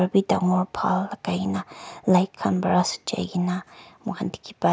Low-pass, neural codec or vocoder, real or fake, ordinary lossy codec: none; none; real; none